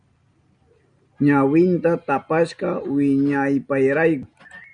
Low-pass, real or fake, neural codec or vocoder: 9.9 kHz; real; none